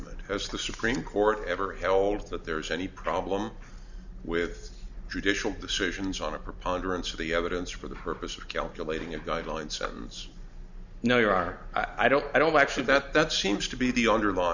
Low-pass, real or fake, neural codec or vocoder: 7.2 kHz; real; none